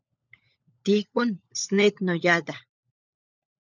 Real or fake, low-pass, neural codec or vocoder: fake; 7.2 kHz; codec, 16 kHz, 16 kbps, FunCodec, trained on LibriTTS, 50 frames a second